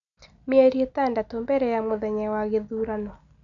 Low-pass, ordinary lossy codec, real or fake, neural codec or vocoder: 7.2 kHz; none; real; none